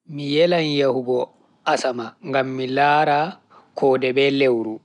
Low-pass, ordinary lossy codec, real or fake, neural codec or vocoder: 10.8 kHz; none; real; none